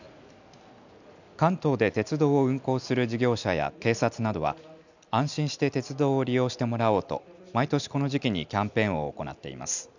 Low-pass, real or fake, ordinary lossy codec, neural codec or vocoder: 7.2 kHz; real; none; none